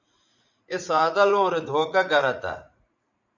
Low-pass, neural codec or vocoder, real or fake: 7.2 kHz; vocoder, 44.1 kHz, 80 mel bands, Vocos; fake